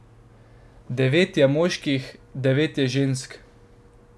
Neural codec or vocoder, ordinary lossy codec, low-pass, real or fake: none; none; none; real